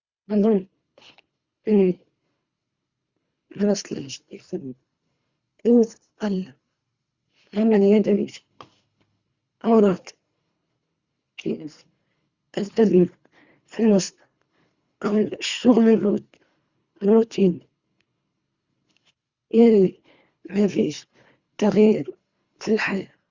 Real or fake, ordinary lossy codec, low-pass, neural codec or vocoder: fake; Opus, 64 kbps; 7.2 kHz; codec, 24 kHz, 3 kbps, HILCodec